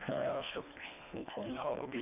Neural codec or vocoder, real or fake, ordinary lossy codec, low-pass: codec, 24 kHz, 1.5 kbps, HILCodec; fake; none; 3.6 kHz